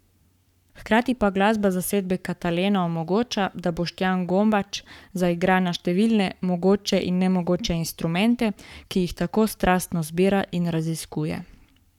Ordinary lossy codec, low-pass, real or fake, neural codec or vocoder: none; 19.8 kHz; fake; codec, 44.1 kHz, 7.8 kbps, Pupu-Codec